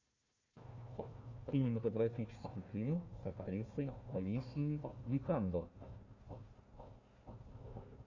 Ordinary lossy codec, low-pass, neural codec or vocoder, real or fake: Opus, 64 kbps; 7.2 kHz; codec, 16 kHz, 1 kbps, FunCodec, trained on Chinese and English, 50 frames a second; fake